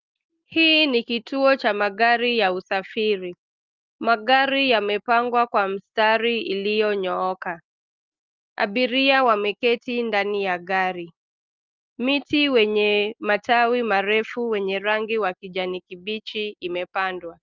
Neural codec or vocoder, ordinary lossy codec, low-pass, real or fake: none; Opus, 32 kbps; 7.2 kHz; real